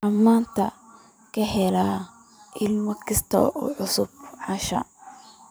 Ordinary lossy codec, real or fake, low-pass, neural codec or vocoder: none; fake; none; vocoder, 44.1 kHz, 128 mel bands every 512 samples, BigVGAN v2